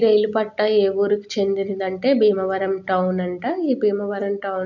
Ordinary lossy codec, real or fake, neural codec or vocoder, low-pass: none; real; none; 7.2 kHz